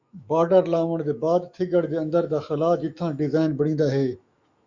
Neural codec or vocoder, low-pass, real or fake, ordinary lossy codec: autoencoder, 48 kHz, 128 numbers a frame, DAC-VAE, trained on Japanese speech; 7.2 kHz; fake; Opus, 64 kbps